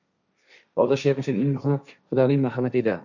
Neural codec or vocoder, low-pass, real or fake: codec, 16 kHz, 1.1 kbps, Voila-Tokenizer; 7.2 kHz; fake